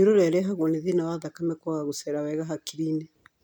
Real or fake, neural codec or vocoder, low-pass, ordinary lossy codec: real; none; 19.8 kHz; none